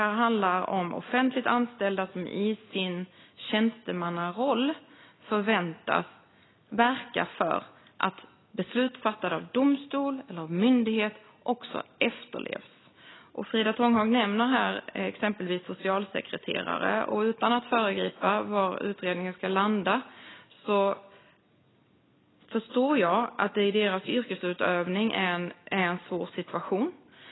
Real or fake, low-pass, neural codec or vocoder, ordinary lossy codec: real; 7.2 kHz; none; AAC, 16 kbps